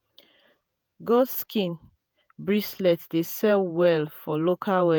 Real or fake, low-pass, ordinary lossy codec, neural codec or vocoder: fake; none; none; vocoder, 48 kHz, 128 mel bands, Vocos